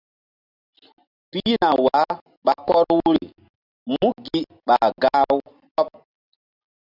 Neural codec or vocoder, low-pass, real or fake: none; 5.4 kHz; real